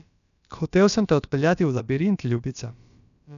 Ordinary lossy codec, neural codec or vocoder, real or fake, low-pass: none; codec, 16 kHz, about 1 kbps, DyCAST, with the encoder's durations; fake; 7.2 kHz